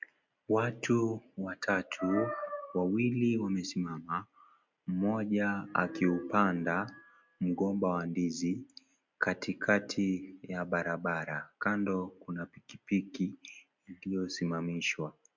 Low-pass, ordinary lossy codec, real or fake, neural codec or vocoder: 7.2 kHz; MP3, 64 kbps; real; none